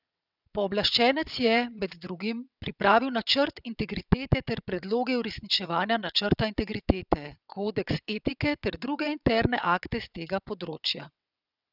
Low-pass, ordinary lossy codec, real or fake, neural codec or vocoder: 5.4 kHz; none; fake; vocoder, 22.05 kHz, 80 mel bands, WaveNeXt